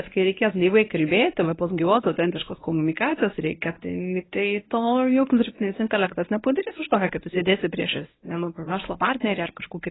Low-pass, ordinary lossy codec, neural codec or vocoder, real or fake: 7.2 kHz; AAC, 16 kbps; codec, 24 kHz, 0.9 kbps, WavTokenizer, medium speech release version 1; fake